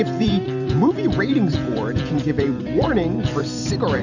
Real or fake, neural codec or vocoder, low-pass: fake; vocoder, 44.1 kHz, 128 mel bands every 256 samples, BigVGAN v2; 7.2 kHz